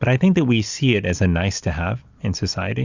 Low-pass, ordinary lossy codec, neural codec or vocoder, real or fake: 7.2 kHz; Opus, 64 kbps; none; real